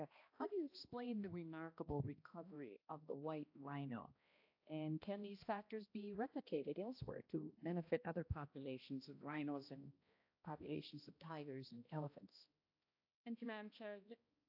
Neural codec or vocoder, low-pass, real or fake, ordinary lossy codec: codec, 16 kHz, 1 kbps, X-Codec, HuBERT features, trained on balanced general audio; 5.4 kHz; fake; AAC, 48 kbps